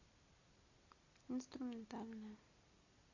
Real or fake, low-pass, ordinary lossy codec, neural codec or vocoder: real; 7.2 kHz; AAC, 48 kbps; none